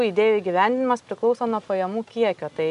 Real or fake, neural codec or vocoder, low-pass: real; none; 10.8 kHz